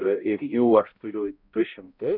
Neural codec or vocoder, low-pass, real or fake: codec, 16 kHz, 0.5 kbps, X-Codec, HuBERT features, trained on balanced general audio; 5.4 kHz; fake